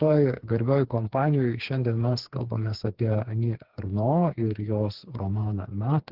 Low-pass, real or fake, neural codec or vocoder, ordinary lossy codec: 5.4 kHz; fake; codec, 16 kHz, 4 kbps, FreqCodec, smaller model; Opus, 16 kbps